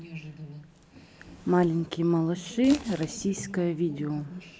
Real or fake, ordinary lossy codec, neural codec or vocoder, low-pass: real; none; none; none